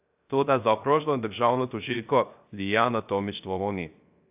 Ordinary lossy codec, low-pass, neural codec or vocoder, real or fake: AAC, 32 kbps; 3.6 kHz; codec, 16 kHz, 0.3 kbps, FocalCodec; fake